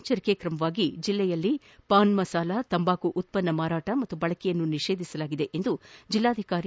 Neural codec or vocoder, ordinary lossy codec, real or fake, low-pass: none; none; real; none